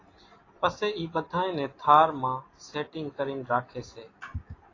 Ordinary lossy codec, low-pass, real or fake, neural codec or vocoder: AAC, 32 kbps; 7.2 kHz; real; none